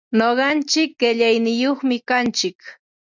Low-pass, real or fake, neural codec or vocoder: 7.2 kHz; real; none